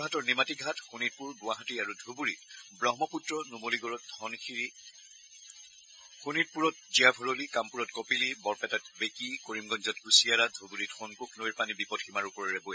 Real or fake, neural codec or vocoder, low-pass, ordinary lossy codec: real; none; none; none